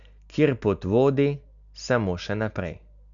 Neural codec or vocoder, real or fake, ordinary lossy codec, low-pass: none; real; none; 7.2 kHz